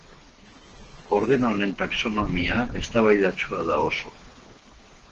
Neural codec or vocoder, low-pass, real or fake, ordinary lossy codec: codec, 16 kHz, 4 kbps, FreqCodec, smaller model; 7.2 kHz; fake; Opus, 16 kbps